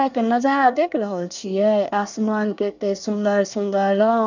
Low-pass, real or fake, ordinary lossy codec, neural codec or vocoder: 7.2 kHz; fake; none; codec, 24 kHz, 1 kbps, SNAC